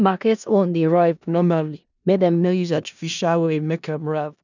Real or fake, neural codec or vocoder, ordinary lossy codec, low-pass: fake; codec, 16 kHz in and 24 kHz out, 0.4 kbps, LongCat-Audio-Codec, four codebook decoder; none; 7.2 kHz